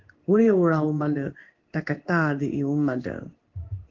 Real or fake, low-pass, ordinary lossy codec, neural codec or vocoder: fake; 7.2 kHz; Opus, 16 kbps; codec, 16 kHz in and 24 kHz out, 1 kbps, XY-Tokenizer